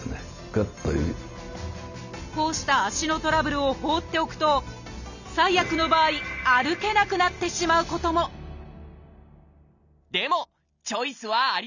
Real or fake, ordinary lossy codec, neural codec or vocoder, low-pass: real; none; none; 7.2 kHz